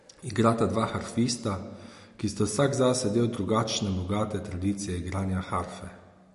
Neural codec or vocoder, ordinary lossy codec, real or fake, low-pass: none; MP3, 48 kbps; real; 14.4 kHz